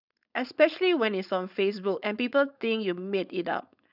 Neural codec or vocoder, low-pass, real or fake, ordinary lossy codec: codec, 16 kHz, 4.8 kbps, FACodec; 5.4 kHz; fake; none